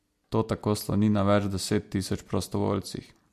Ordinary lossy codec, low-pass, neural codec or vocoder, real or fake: MP3, 64 kbps; 14.4 kHz; none; real